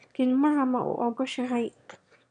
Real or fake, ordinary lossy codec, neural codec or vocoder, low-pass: fake; none; autoencoder, 22.05 kHz, a latent of 192 numbers a frame, VITS, trained on one speaker; 9.9 kHz